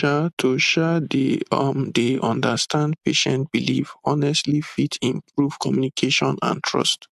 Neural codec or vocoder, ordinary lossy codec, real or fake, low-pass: none; AAC, 96 kbps; real; 14.4 kHz